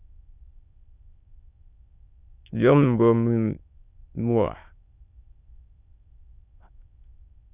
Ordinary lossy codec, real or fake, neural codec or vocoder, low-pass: Opus, 64 kbps; fake; autoencoder, 22.05 kHz, a latent of 192 numbers a frame, VITS, trained on many speakers; 3.6 kHz